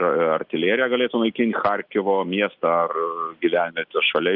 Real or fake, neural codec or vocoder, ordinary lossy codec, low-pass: real; none; Opus, 32 kbps; 5.4 kHz